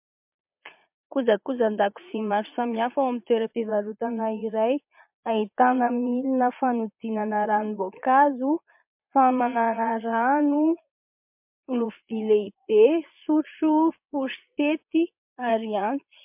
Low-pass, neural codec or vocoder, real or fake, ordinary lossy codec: 3.6 kHz; vocoder, 22.05 kHz, 80 mel bands, Vocos; fake; MP3, 32 kbps